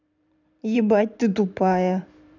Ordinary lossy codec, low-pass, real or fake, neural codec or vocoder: none; 7.2 kHz; fake; vocoder, 44.1 kHz, 128 mel bands every 512 samples, BigVGAN v2